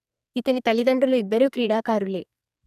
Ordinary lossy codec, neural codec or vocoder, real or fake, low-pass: none; codec, 44.1 kHz, 2.6 kbps, SNAC; fake; 14.4 kHz